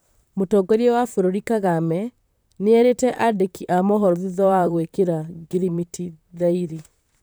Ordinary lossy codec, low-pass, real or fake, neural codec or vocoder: none; none; fake; vocoder, 44.1 kHz, 128 mel bands, Pupu-Vocoder